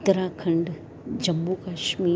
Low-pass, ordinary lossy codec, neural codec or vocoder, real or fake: none; none; none; real